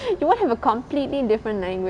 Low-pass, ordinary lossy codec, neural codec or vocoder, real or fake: 9.9 kHz; none; none; real